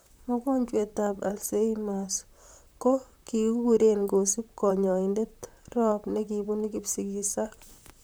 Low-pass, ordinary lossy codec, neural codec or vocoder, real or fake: none; none; vocoder, 44.1 kHz, 128 mel bands, Pupu-Vocoder; fake